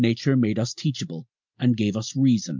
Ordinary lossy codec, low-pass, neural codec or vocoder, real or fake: MP3, 64 kbps; 7.2 kHz; none; real